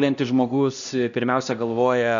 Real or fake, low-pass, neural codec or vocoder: fake; 7.2 kHz; codec, 16 kHz, 1 kbps, X-Codec, HuBERT features, trained on LibriSpeech